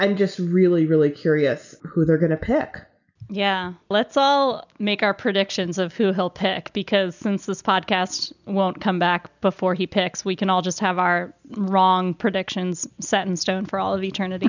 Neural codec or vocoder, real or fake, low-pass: none; real; 7.2 kHz